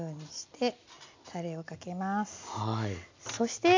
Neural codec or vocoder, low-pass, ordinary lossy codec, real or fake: none; 7.2 kHz; MP3, 64 kbps; real